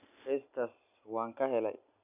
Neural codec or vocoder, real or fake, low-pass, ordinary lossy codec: none; real; 3.6 kHz; none